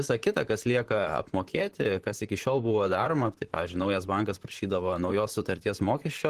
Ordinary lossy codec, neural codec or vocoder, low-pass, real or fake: Opus, 16 kbps; vocoder, 44.1 kHz, 128 mel bands, Pupu-Vocoder; 14.4 kHz; fake